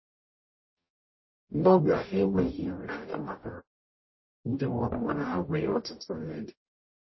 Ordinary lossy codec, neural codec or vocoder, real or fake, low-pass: MP3, 24 kbps; codec, 44.1 kHz, 0.9 kbps, DAC; fake; 7.2 kHz